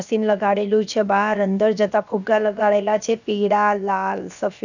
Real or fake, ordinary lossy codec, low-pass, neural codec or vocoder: fake; none; 7.2 kHz; codec, 16 kHz, 0.7 kbps, FocalCodec